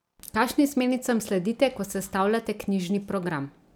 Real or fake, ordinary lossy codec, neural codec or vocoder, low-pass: real; none; none; none